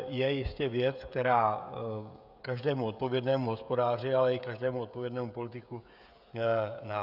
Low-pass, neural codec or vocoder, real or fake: 5.4 kHz; codec, 16 kHz, 16 kbps, FreqCodec, smaller model; fake